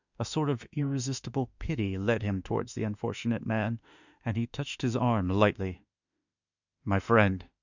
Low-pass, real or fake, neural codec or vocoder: 7.2 kHz; fake; autoencoder, 48 kHz, 32 numbers a frame, DAC-VAE, trained on Japanese speech